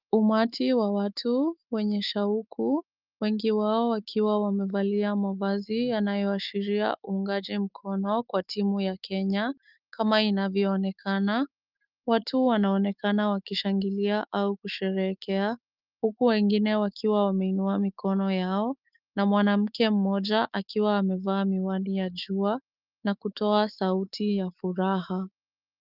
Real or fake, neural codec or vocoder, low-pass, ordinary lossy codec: fake; autoencoder, 48 kHz, 128 numbers a frame, DAC-VAE, trained on Japanese speech; 5.4 kHz; Opus, 24 kbps